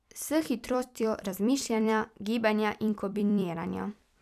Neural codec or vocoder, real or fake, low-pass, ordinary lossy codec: vocoder, 44.1 kHz, 128 mel bands every 256 samples, BigVGAN v2; fake; 14.4 kHz; none